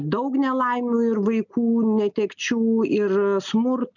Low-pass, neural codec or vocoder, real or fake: 7.2 kHz; none; real